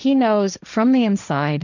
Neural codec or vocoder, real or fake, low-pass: codec, 16 kHz, 1.1 kbps, Voila-Tokenizer; fake; 7.2 kHz